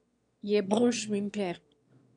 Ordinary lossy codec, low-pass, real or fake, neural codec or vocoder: MP3, 64 kbps; 9.9 kHz; fake; autoencoder, 22.05 kHz, a latent of 192 numbers a frame, VITS, trained on one speaker